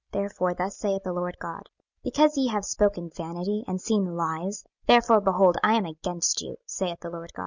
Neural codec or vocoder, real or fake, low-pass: none; real; 7.2 kHz